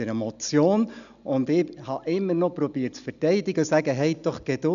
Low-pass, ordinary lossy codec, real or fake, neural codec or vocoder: 7.2 kHz; none; real; none